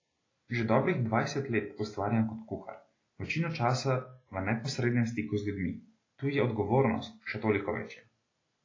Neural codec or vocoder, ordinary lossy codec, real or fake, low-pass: none; AAC, 32 kbps; real; 7.2 kHz